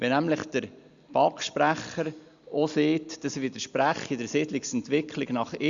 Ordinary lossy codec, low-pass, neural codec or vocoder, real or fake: Opus, 64 kbps; 7.2 kHz; none; real